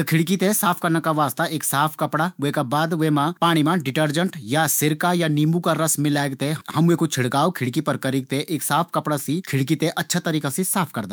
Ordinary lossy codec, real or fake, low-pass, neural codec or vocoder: none; fake; none; autoencoder, 48 kHz, 128 numbers a frame, DAC-VAE, trained on Japanese speech